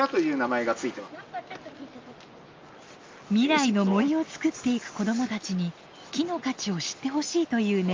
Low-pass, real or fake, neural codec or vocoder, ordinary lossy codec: 7.2 kHz; real; none; Opus, 32 kbps